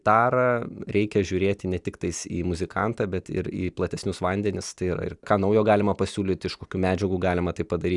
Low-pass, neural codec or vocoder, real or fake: 10.8 kHz; none; real